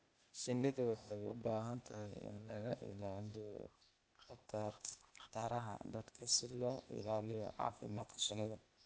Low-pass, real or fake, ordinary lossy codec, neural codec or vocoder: none; fake; none; codec, 16 kHz, 0.8 kbps, ZipCodec